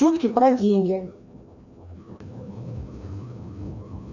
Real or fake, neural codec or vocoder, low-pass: fake; codec, 16 kHz, 1 kbps, FreqCodec, larger model; 7.2 kHz